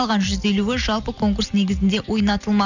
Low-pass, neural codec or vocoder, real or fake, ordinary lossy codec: 7.2 kHz; none; real; none